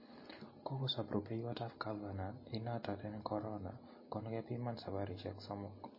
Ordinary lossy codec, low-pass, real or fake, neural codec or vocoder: MP3, 24 kbps; 5.4 kHz; real; none